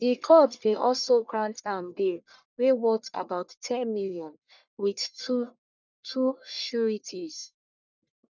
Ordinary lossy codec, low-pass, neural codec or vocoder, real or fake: none; 7.2 kHz; codec, 44.1 kHz, 1.7 kbps, Pupu-Codec; fake